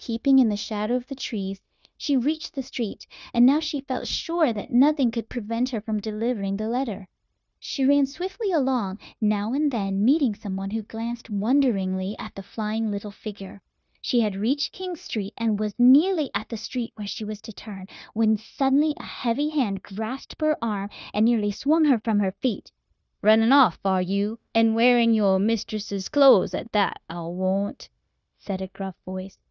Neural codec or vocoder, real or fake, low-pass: codec, 16 kHz, 0.9 kbps, LongCat-Audio-Codec; fake; 7.2 kHz